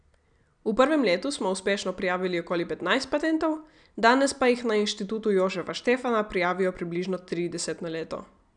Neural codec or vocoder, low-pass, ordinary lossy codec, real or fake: none; 9.9 kHz; none; real